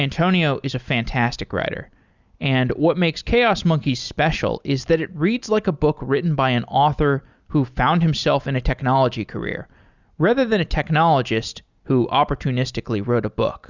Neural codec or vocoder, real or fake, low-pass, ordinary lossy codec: none; real; 7.2 kHz; Opus, 64 kbps